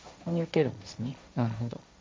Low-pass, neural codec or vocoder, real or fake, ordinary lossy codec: none; codec, 16 kHz, 1.1 kbps, Voila-Tokenizer; fake; none